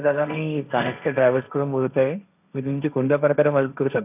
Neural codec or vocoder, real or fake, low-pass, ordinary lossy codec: codec, 16 kHz, 1.1 kbps, Voila-Tokenizer; fake; 3.6 kHz; none